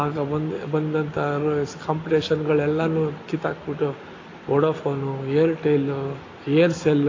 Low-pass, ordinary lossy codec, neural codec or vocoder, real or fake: 7.2 kHz; AAC, 32 kbps; none; real